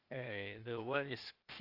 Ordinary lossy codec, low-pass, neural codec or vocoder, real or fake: Opus, 32 kbps; 5.4 kHz; codec, 16 kHz, 0.8 kbps, ZipCodec; fake